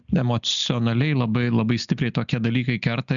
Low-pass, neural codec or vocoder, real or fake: 7.2 kHz; none; real